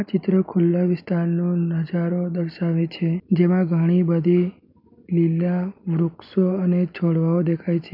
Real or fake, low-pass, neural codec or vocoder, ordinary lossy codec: real; 5.4 kHz; none; MP3, 48 kbps